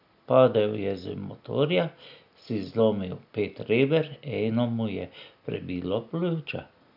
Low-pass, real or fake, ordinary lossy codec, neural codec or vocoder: 5.4 kHz; real; none; none